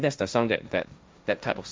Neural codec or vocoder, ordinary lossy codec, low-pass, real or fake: codec, 16 kHz, 1.1 kbps, Voila-Tokenizer; none; none; fake